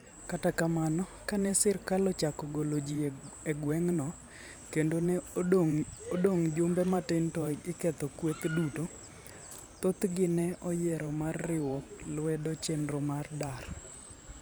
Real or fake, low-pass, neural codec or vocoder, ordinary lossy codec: fake; none; vocoder, 44.1 kHz, 128 mel bands every 512 samples, BigVGAN v2; none